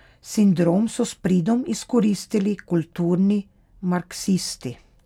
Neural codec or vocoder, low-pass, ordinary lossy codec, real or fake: none; 19.8 kHz; none; real